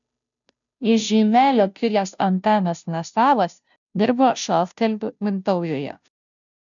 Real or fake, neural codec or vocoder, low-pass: fake; codec, 16 kHz, 0.5 kbps, FunCodec, trained on Chinese and English, 25 frames a second; 7.2 kHz